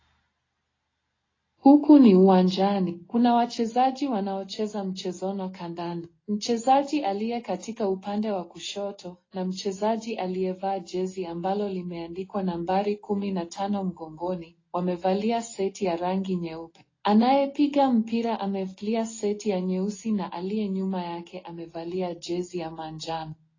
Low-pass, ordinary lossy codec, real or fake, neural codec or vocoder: 7.2 kHz; AAC, 32 kbps; real; none